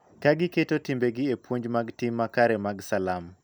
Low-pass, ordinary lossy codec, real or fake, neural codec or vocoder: none; none; real; none